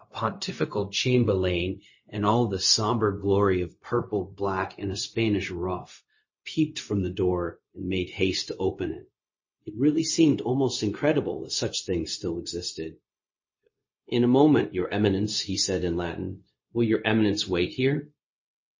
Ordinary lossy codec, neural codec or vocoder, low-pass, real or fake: MP3, 32 kbps; codec, 16 kHz, 0.4 kbps, LongCat-Audio-Codec; 7.2 kHz; fake